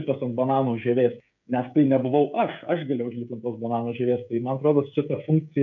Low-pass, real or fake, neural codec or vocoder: 7.2 kHz; fake; codec, 16 kHz, 16 kbps, FreqCodec, smaller model